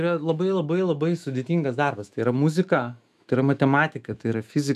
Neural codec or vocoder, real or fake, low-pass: autoencoder, 48 kHz, 128 numbers a frame, DAC-VAE, trained on Japanese speech; fake; 14.4 kHz